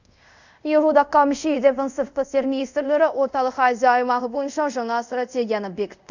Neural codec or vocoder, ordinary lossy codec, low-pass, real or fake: codec, 24 kHz, 0.5 kbps, DualCodec; none; 7.2 kHz; fake